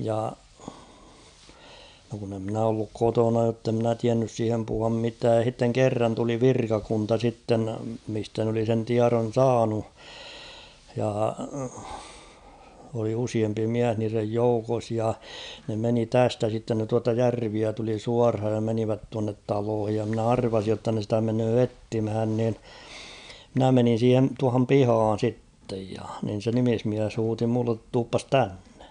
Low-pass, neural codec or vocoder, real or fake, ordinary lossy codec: 9.9 kHz; none; real; none